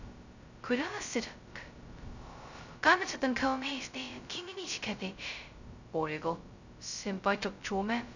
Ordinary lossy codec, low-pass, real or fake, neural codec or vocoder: none; 7.2 kHz; fake; codec, 16 kHz, 0.2 kbps, FocalCodec